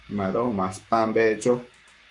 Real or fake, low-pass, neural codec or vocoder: fake; 10.8 kHz; codec, 44.1 kHz, 7.8 kbps, Pupu-Codec